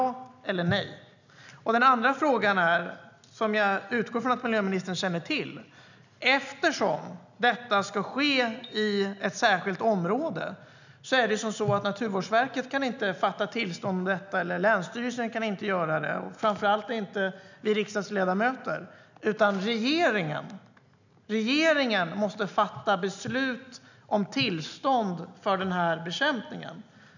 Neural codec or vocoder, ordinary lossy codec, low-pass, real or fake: none; none; 7.2 kHz; real